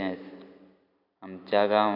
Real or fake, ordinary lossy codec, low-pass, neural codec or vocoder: real; none; 5.4 kHz; none